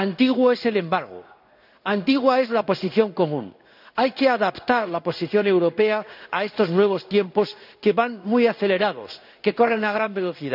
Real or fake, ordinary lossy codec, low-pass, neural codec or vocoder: fake; none; 5.4 kHz; codec, 16 kHz in and 24 kHz out, 1 kbps, XY-Tokenizer